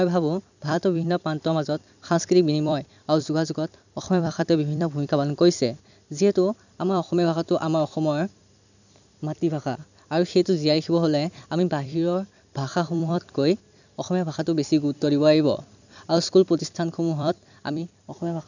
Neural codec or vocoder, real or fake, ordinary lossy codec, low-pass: vocoder, 44.1 kHz, 128 mel bands every 256 samples, BigVGAN v2; fake; none; 7.2 kHz